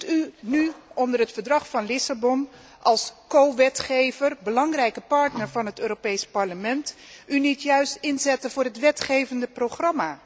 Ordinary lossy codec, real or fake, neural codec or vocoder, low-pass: none; real; none; none